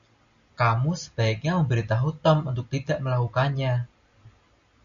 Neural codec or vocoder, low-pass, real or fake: none; 7.2 kHz; real